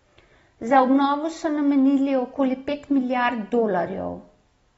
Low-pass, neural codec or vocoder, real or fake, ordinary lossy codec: 9.9 kHz; none; real; AAC, 24 kbps